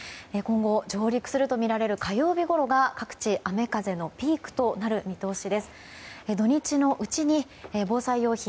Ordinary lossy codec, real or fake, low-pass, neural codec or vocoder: none; real; none; none